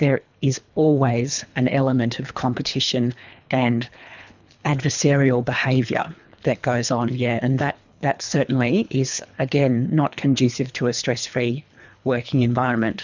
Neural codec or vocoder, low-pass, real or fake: codec, 24 kHz, 3 kbps, HILCodec; 7.2 kHz; fake